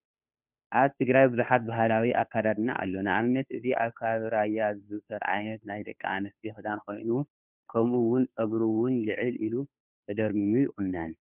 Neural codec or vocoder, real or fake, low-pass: codec, 16 kHz, 2 kbps, FunCodec, trained on Chinese and English, 25 frames a second; fake; 3.6 kHz